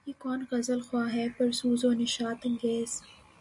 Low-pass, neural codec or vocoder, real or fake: 10.8 kHz; none; real